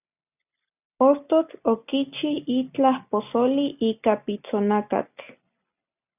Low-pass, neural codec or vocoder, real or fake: 3.6 kHz; none; real